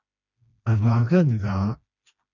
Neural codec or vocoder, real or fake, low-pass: codec, 16 kHz, 2 kbps, FreqCodec, smaller model; fake; 7.2 kHz